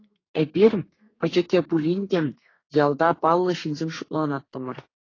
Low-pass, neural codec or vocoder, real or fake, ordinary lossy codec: 7.2 kHz; codec, 32 kHz, 1.9 kbps, SNAC; fake; AAC, 32 kbps